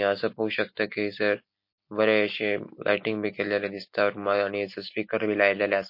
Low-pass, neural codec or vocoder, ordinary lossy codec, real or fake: 5.4 kHz; none; MP3, 32 kbps; real